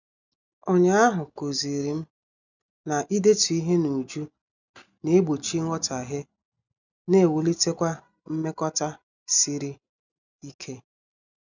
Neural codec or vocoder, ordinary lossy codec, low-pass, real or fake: none; none; 7.2 kHz; real